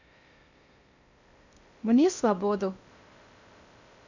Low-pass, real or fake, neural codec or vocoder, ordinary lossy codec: 7.2 kHz; fake; codec, 16 kHz in and 24 kHz out, 0.6 kbps, FocalCodec, streaming, 2048 codes; none